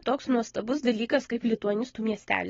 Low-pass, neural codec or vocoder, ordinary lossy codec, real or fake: 7.2 kHz; none; AAC, 24 kbps; real